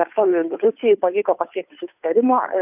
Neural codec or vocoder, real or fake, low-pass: codec, 16 kHz, 2 kbps, FunCodec, trained on Chinese and English, 25 frames a second; fake; 3.6 kHz